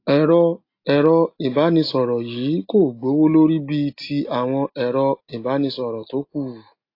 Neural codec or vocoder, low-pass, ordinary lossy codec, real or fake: none; 5.4 kHz; AAC, 32 kbps; real